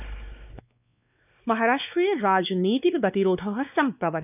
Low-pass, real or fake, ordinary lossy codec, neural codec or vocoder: 3.6 kHz; fake; none; codec, 16 kHz, 2 kbps, X-Codec, WavLM features, trained on Multilingual LibriSpeech